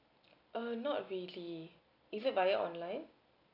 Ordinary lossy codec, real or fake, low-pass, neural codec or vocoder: none; real; 5.4 kHz; none